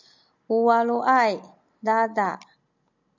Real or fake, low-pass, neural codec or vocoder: real; 7.2 kHz; none